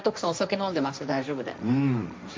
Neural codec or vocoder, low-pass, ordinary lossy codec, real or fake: codec, 16 kHz, 1.1 kbps, Voila-Tokenizer; none; none; fake